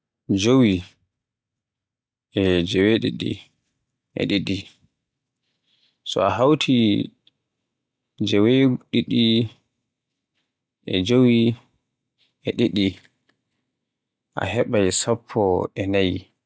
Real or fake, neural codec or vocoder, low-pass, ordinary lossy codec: real; none; none; none